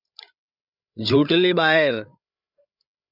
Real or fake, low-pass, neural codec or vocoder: fake; 5.4 kHz; codec, 16 kHz, 16 kbps, FreqCodec, larger model